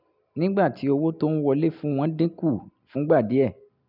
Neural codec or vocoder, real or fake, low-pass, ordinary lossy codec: none; real; 5.4 kHz; none